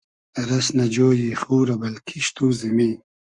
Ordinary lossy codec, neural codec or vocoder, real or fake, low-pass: Opus, 64 kbps; codec, 44.1 kHz, 7.8 kbps, Pupu-Codec; fake; 10.8 kHz